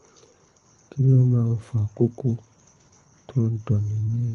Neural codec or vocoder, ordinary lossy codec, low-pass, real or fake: codec, 24 kHz, 3 kbps, HILCodec; none; 10.8 kHz; fake